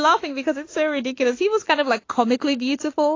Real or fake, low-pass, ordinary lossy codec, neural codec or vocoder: fake; 7.2 kHz; AAC, 32 kbps; autoencoder, 48 kHz, 32 numbers a frame, DAC-VAE, trained on Japanese speech